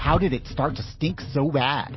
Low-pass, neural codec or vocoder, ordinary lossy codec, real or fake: 7.2 kHz; none; MP3, 24 kbps; real